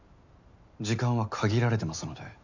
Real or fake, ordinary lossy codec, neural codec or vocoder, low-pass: real; none; none; 7.2 kHz